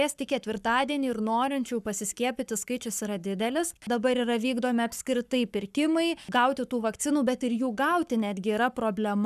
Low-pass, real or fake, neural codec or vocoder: 14.4 kHz; fake; codec, 44.1 kHz, 7.8 kbps, Pupu-Codec